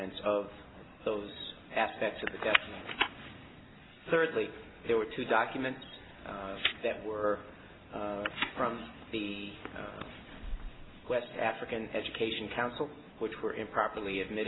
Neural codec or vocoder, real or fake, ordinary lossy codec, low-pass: none; real; AAC, 16 kbps; 7.2 kHz